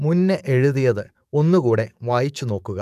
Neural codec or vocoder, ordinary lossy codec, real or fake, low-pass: codec, 44.1 kHz, 7.8 kbps, DAC; none; fake; 14.4 kHz